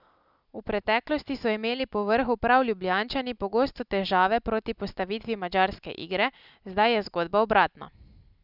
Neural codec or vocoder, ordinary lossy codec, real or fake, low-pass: none; none; real; 5.4 kHz